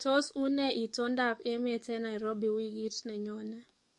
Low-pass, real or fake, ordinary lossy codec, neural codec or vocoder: 10.8 kHz; fake; MP3, 48 kbps; codec, 44.1 kHz, 7.8 kbps, DAC